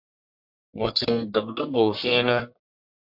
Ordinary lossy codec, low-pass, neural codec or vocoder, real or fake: MP3, 48 kbps; 5.4 kHz; codec, 44.1 kHz, 1.7 kbps, Pupu-Codec; fake